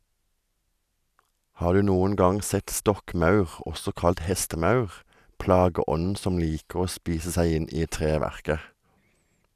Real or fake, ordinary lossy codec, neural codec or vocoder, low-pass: real; none; none; 14.4 kHz